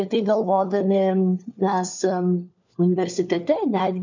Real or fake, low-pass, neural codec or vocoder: fake; 7.2 kHz; codec, 16 kHz, 4 kbps, FunCodec, trained on LibriTTS, 50 frames a second